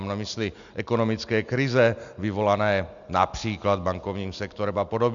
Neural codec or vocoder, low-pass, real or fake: none; 7.2 kHz; real